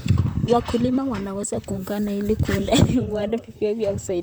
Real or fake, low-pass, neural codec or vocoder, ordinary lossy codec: fake; none; vocoder, 44.1 kHz, 128 mel bands, Pupu-Vocoder; none